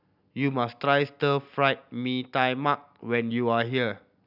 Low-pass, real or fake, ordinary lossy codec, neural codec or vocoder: 5.4 kHz; real; none; none